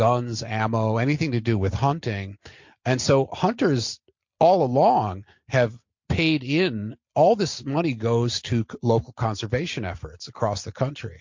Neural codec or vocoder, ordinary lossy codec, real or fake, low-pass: none; MP3, 48 kbps; real; 7.2 kHz